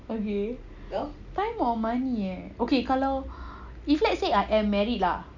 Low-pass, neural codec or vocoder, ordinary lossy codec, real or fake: 7.2 kHz; none; none; real